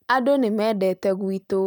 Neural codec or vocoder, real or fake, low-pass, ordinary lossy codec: none; real; none; none